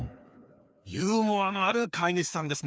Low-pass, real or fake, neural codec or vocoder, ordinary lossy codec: none; fake; codec, 16 kHz, 2 kbps, FreqCodec, larger model; none